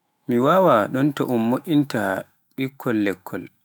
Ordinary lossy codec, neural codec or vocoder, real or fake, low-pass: none; autoencoder, 48 kHz, 128 numbers a frame, DAC-VAE, trained on Japanese speech; fake; none